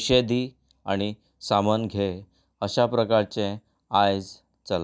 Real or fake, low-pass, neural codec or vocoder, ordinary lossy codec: real; none; none; none